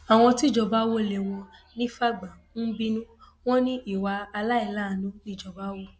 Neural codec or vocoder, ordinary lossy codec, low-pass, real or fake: none; none; none; real